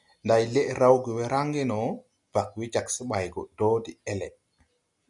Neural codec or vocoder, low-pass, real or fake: none; 10.8 kHz; real